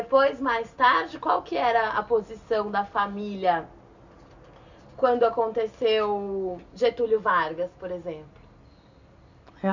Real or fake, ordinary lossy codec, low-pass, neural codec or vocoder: real; none; 7.2 kHz; none